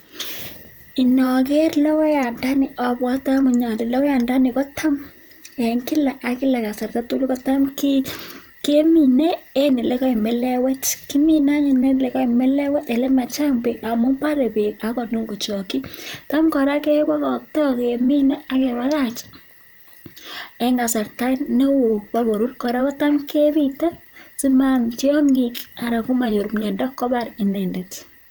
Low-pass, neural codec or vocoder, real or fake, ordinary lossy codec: none; vocoder, 44.1 kHz, 128 mel bands, Pupu-Vocoder; fake; none